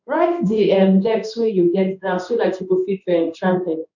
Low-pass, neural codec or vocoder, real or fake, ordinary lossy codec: 7.2 kHz; codec, 16 kHz in and 24 kHz out, 1 kbps, XY-Tokenizer; fake; none